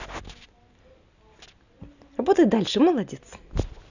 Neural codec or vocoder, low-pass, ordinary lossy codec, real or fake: none; 7.2 kHz; none; real